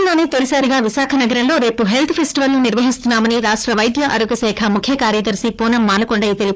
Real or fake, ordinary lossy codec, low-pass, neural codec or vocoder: fake; none; none; codec, 16 kHz, 4 kbps, FunCodec, trained on Chinese and English, 50 frames a second